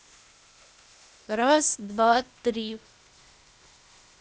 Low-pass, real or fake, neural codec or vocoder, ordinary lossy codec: none; fake; codec, 16 kHz, 0.8 kbps, ZipCodec; none